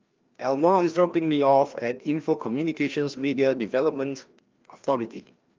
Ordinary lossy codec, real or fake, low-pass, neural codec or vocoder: Opus, 24 kbps; fake; 7.2 kHz; codec, 16 kHz, 1 kbps, FreqCodec, larger model